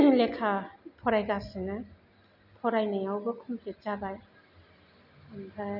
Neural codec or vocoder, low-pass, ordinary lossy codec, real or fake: none; 5.4 kHz; none; real